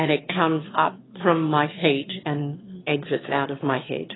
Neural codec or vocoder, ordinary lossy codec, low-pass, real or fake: autoencoder, 22.05 kHz, a latent of 192 numbers a frame, VITS, trained on one speaker; AAC, 16 kbps; 7.2 kHz; fake